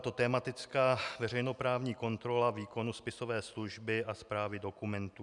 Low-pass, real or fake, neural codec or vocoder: 10.8 kHz; real; none